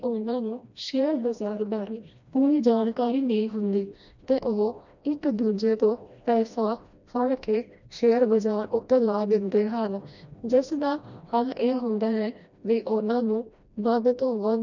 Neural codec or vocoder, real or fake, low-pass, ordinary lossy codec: codec, 16 kHz, 1 kbps, FreqCodec, smaller model; fake; 7.2 kHz; none